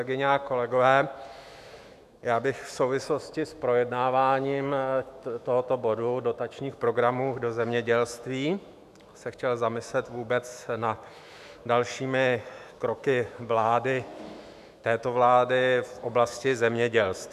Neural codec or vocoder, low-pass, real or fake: autoencoder, 48 kHz, 128 numbers a frame, DAC-VAE, trained on Japanese speech; 14.4 kHz; fake